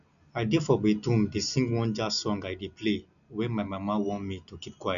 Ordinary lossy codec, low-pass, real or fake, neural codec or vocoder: none; 7.2 kHz; real; none